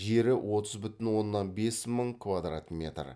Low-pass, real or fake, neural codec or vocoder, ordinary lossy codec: none; real; none; none